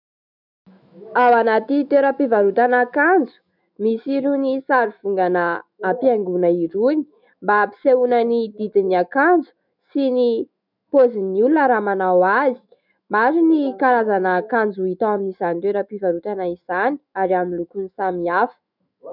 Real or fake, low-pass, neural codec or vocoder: fake; 5.4 kHz; autoencoder, 48 kHz, 128 numbers a frame, DAC-VAE, trained on Japanese speech